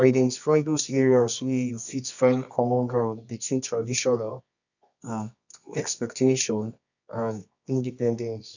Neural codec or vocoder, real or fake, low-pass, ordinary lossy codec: codec, 24 kHz, 0.9 kbps, WavTokenizer, medium music audio release; fake; 7.2 kHz; none